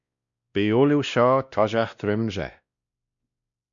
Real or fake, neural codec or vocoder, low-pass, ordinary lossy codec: fake; codec, 16 kHz, 1 kbps, X-Codec, WavLM features, trained on Multilingual LibriSpeech; 7.2 kHz; MP3, 96 kbps